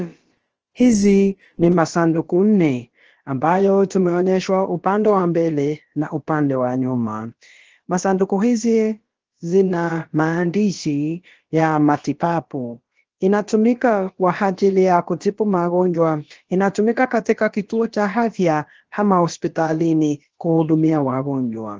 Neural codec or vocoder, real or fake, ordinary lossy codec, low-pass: codec, 16 kHz, about 1 kbps, DyCAST, with the encoder's durations; fake; Opus, 16 kbps; 7.2 kHz